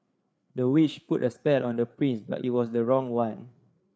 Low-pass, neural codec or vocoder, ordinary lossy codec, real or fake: none; codec, 16 kHz, 4 kbps, FreqCodec, larger model; none; fake